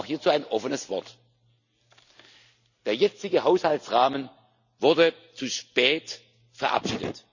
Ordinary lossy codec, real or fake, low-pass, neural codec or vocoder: none; real; 7.2 kHz; none